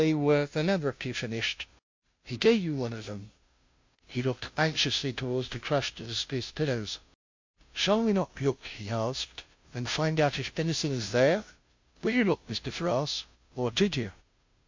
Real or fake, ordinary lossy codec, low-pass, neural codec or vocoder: fake; MP3, 48 kbps; 7.2 kHz; codec, 16 kHz, 0.5 kbps, FunCodec, trained on Chinese and English, 25 frames a second